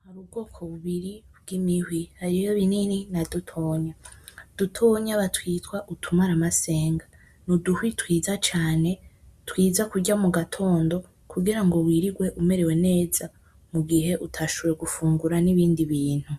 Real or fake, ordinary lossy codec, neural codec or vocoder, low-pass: real; Opus, 64 kbps; none; 14.4 kHz